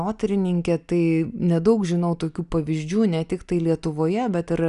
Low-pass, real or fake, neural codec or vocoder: 10.8 kHz; real; none